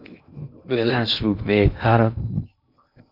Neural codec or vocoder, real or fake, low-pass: codec, 16 kHz in and 24 kHz out, 0.6 kbps, FocalCodec, streaming, 2048 codes; fake; 5.4 kHz